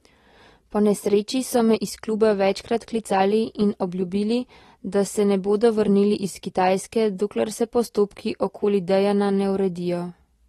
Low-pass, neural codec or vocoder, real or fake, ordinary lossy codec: 19.8 kHz; none; real; AAC, 32 kbps